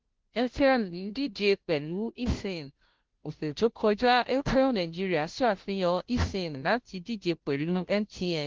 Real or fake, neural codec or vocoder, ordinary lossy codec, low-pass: fake; codec, 16 kHz, 0.5 kbps, FunCodec, trained on Chinese and English, 25 frames a second; Opus, 16 kbps; 7.2 kHz